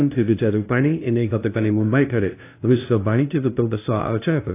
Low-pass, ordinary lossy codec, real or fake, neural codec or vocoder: 3.6 kHz; AAC, 24 kbps; fake; codec, 16 kHz, 0.5 kbps, FunCodec, trained on LibriTTS, 25 frames a second